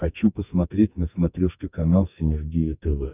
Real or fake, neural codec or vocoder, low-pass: fake; codec, 44.1 kHz, 2.6 kbps, SNAC; 3.6 kHz